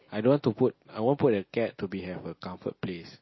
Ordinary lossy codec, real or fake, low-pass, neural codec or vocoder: MP3, 24 kbps; real; 7.2 kHz; none